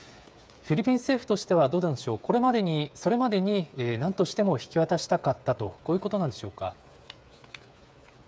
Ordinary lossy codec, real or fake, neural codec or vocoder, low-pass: none; fake; codec, 16 kHz, 8 kbps, FreqCodec, smaller model; none